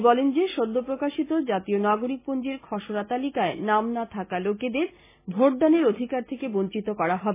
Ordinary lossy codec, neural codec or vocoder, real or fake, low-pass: MP3, 16 kbps; none; real; 3.6 kHz